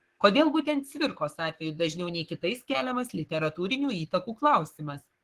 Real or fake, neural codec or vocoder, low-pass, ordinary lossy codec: fake; codec, 44.1 kHz, 7.8 kbps, Pupu-Codec; 14.4 kHz; Opus, 16 kbps